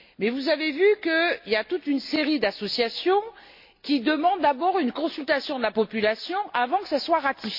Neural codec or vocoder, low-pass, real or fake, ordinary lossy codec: none; 5.4 kHz; real; MP3, 32 kbps